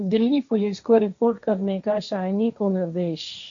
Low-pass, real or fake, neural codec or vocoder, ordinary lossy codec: 7.2 kHz; fake; codec, 16 kHz, 1.1 kbps, Voila-Tokenizer; MP3, 64 kbps